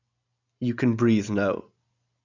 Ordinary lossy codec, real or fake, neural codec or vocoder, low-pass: none; real; none; 7.2 kHz